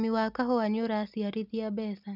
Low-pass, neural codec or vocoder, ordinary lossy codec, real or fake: 5.4 kHz; none; none; real